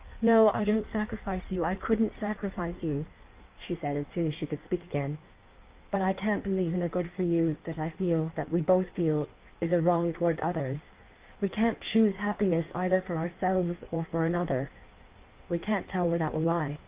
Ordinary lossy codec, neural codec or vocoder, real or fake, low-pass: Opus, 32 kbps; codec, 16 kHz in and 24 kHz out, 1.1 kbps, FireRedTTS-2 codec; fake; 3.6 kHz